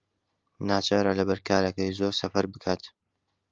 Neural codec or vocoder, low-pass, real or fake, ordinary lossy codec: none; 7.2 kHz; real; Opus, 32 kbps